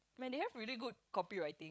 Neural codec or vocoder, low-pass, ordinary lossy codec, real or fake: codec, 16 kHz, 16 kbps, FreqCodec, larger model; none; none; fake